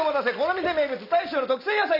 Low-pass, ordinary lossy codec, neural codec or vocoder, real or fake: 5.4 kHz; none; none; real